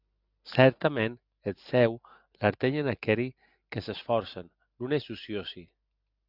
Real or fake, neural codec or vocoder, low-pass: real; none; 5.4 kHz